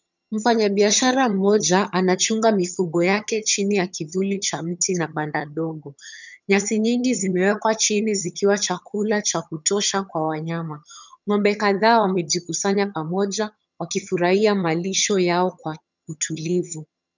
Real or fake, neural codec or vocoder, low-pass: fake; vocoder, 22.05 kHz, 80 mel bands, HiFi-GAN; 7.2 kHz